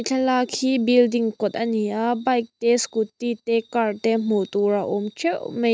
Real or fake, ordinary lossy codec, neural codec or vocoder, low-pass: real; none; none; none